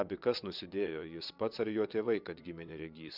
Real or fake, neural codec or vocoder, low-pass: fake; vocoder, 22.05 kHz, 80 mel bands, WaveNeXt; 5.4 kHz